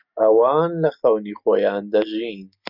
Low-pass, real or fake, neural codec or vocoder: 5.4 kHz; real; none